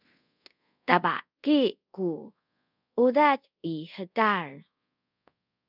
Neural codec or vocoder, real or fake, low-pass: codec, 24 kHz, 0.5 kbps, DualCodec; fake; 5.4 kHz